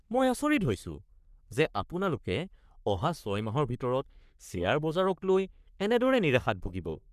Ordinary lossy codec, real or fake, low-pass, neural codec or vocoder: none; fake; 14.4 kHz; codec, 44.1 kHz, 3.4 kbps, Pupu-Codec